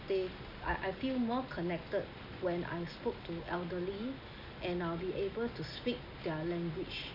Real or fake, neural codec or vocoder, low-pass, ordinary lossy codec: real; none; 5.4 kHz; none